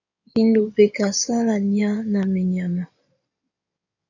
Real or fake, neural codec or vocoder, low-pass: fake; codec, 16 kHz in and 24 kHz out, 2.2 kbps, FireRedTTS-2 codec; 7.2 kHz